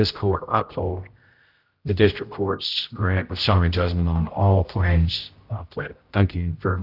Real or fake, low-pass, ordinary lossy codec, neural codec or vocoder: fake; 5.4 kHz; Opus, 32 kbps; codec, 16 kHz, 0.5 kbps, X-Codec, HuBERT features, trained on general audio